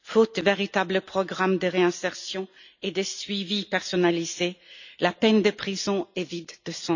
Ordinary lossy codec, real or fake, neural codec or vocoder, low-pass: none; real; none; 7.2 kHz